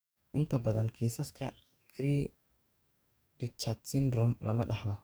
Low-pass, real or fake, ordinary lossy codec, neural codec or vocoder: none; fake; none; codec, 44.1 kHz, 2.6 kbps, DAC